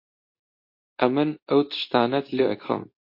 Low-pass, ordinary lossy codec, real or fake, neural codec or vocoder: 5.4 kHz; MP3, 32 kbps; fake; codec, 24 kHz, 0.9 kbps, WavTokenizer, medium speech release version 2